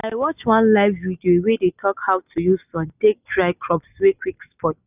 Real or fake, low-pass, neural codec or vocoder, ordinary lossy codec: real; 3.6 kHz; none; none